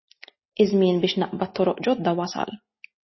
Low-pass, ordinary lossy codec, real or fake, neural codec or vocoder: 7.2 kHz; MP3, 24 kbps; real; none